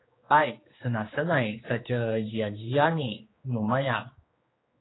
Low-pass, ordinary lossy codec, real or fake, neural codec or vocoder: 7.2 kHz; AAC, 16 kbps; fake; codec, 16 kHz, 2 kbps, X-Codec, HuBERT features, trained on general audio